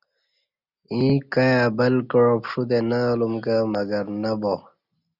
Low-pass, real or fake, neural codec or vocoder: 5.4 kHz; real; none